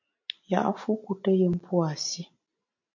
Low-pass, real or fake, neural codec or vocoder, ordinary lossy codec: 7.2 kHz; real; none; MP3, 48 kbps